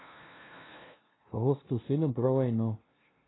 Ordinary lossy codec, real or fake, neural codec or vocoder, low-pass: AAC, 16 kbps; fake; codec, 16 kHz, 0.5 kbps, FunCodec, trained on LibriTTS, 25 frames a second; 7.2 kHz